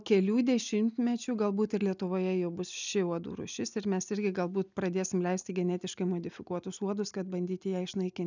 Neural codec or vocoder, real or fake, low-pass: none; real; 7.2 kHz